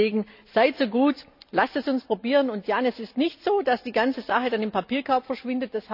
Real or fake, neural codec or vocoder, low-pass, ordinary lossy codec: real; none; 5.4 kHz; none